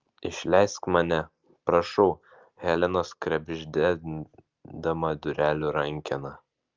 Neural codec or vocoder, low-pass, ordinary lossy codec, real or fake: none; 7.2 kHz; Opus, 24 kbps; real